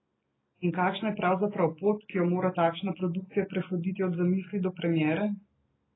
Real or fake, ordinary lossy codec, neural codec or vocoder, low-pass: real; AAC, 16 kbps; none; 7.2 kHz